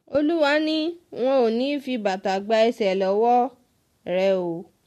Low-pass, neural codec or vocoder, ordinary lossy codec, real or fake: 14.4 kHz; none; MP3, 64 kbps; real